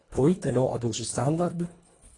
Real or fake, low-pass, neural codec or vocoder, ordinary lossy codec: fake; 10.8 kHz; codec, 24 kHz, 1.5 kbps, HILCodec; AAC, 32 kbps